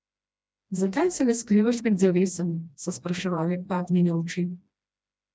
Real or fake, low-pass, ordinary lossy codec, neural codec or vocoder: fake; none; none; codec, 16 kHz, 1 kbps, FreqCodec, smaller model